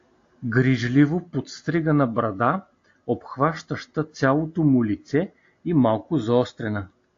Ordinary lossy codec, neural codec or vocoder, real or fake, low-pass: AAC, 48 kbps; none; real; 7.2 kHz